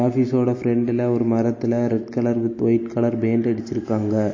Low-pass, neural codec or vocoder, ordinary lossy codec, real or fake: 7.2 kHz; none; MP3, 32 kbps; real